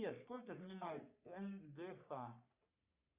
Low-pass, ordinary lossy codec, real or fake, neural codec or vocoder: 3.6 kHz; Opus, 64 kbps; fake; codec, 44.1 kHz, 3.4 kbps, Pupu-Codec